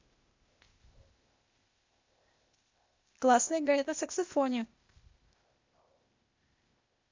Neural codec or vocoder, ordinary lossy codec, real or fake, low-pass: codec, 16 kHz, 0.8 kbps, ZipCodec; MP3, 48 kbps; fake; 7.2 kHz